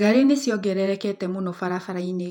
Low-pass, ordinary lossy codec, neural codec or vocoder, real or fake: 19.8 kHz; none; vocoder, 48 kHz, 128 mel bands, Vocos; fake